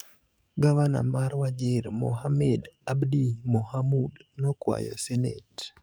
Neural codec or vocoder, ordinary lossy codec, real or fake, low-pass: codec, 44.1 kHz, 7.8 kbps, DAC; none; fake; none